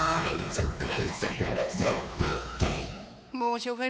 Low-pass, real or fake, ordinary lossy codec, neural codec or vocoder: none; fake; none; codec, 16 kHz, 2 kbps, X-Codec, WavLM features, trained on Multilingual LibriSpeech